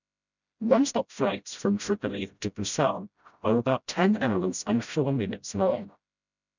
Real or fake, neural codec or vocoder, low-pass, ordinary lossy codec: fake; codec, 16 kHz, 0.5 kbps, FreqCodec, smaller model; 7.2 kHz; none